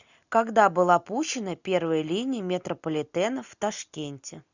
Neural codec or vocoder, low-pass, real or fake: none; 7.2 kHz; real